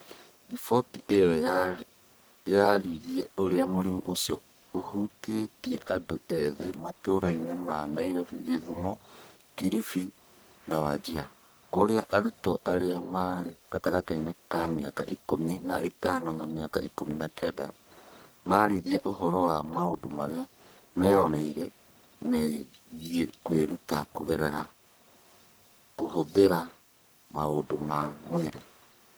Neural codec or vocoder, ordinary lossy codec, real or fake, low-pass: codec, 44.1 kHz, 1.7 kbps, Pupu-Codec; none; fake; none